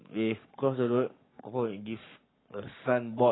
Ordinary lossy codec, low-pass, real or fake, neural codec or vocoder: AAC, 16 kbps; 7.2 kHz; fake; codec, 44.1 kHz, 7.8 kbps, Pupu-Codec